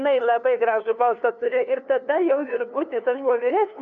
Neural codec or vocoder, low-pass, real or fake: codec, 16 kHz, 2 kbps, FunCodec, trained on LibriTTS, 25 frames a second; 7.2 kHz; fake